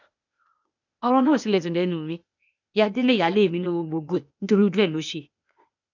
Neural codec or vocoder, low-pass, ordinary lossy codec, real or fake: codec, 16 kHz, 0.8 kbps, ZipCodec; 7.2 kHz; none; fake